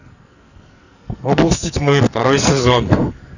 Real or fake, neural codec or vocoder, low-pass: fake; codec, 44.1 kHz, 2.6 kbps, SNAC; 7.2 kHz